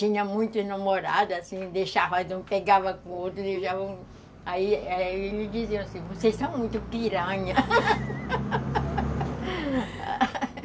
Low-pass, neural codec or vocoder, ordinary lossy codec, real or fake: none; none; none; real